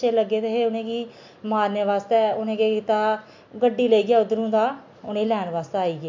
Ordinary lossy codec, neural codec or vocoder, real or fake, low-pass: MP3, 64 kbps; none; real; 7.2 kHz